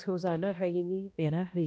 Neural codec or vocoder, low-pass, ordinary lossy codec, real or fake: codec, 16 kHz, 1 kbps, X-Codec, HuBERT features, trained on balanced general audio; none; none; fake